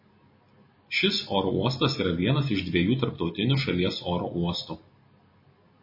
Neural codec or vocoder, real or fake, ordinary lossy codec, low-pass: none; real; MP3, 24 kbps; 5.4 kHz